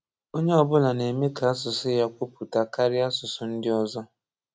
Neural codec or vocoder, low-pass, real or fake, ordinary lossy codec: none; none; real; none